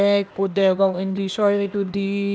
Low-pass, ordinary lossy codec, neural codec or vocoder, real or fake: none; none; codec, 16 kHz, 0.8 kbps, ZipCodec; fake